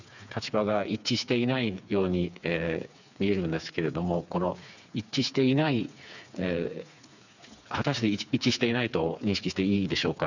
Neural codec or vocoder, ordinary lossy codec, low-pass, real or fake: codec, 16 kHz, 4 kbps, FreqCodec, smaller model; none; 7.2 kHz; fake